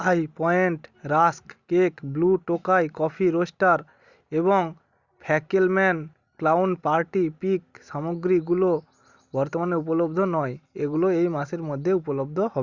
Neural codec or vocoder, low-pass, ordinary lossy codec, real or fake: none; 7.2 kHz; Opus, 64 kbps; real